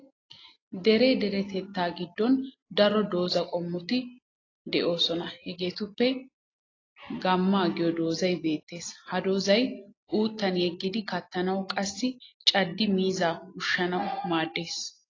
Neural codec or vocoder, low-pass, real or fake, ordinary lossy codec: none; 7.2 kHz; real; AAC, 32 kbps